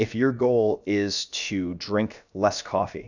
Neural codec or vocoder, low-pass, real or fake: codec, 16 kHz, about 1 kbps, DyCAST, with the encoder's durations; 7.2 kHz; fake